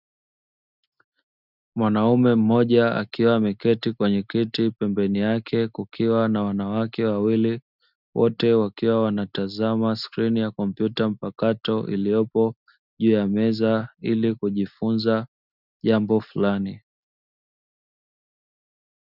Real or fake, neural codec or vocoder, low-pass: real; none; 5.4 kHz